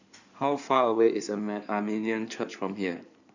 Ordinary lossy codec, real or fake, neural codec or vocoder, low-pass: none; fake; codec, 16 kHz in and 24 kHz out, 2.2 kbps, FireRedTTS-2 codec; 7.2 kHz